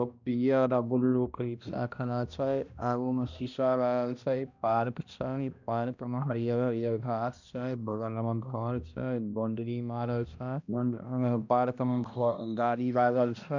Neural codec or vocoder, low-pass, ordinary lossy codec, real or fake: codec, 16 kHz, 1 kbps, X-Codec, HuBERT features, trained on balanced general audio; 7.2 kHz; none; fake